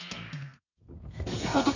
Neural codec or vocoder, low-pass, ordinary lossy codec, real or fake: codec, 32 kHz, 1.9 kbps, SNAC; 7.2 kHz; none; fake